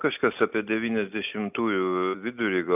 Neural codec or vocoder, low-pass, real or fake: none; 3.6 kHz; real